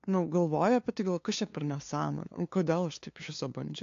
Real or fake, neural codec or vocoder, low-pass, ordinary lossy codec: fake; codec, 16 kHz, 2 kbps, FunCodec, trained on LibriTTS, 25 frames a second; 7.2 kHz; AAC, 48 kbps